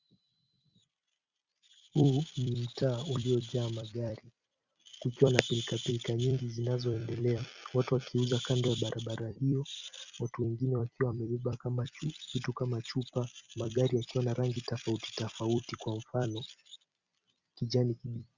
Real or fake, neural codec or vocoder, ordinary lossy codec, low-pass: fake; vocoder, 44.1 kHz, 128 mel bands every 256 samples, BigVGAN v2; Opus, 64 kbps; 7.2 kHz